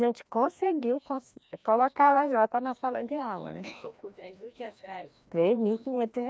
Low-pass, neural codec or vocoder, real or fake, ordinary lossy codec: none; codec, 16 kHz, 1 kbps, FreqCodec, larger model; fake; none